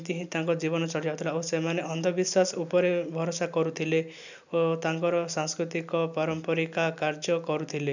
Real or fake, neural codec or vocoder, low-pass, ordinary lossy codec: real; none; 7.2 kHz; none